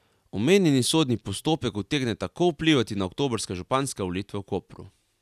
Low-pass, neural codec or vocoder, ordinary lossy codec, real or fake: 14.4 kHz; none; none; real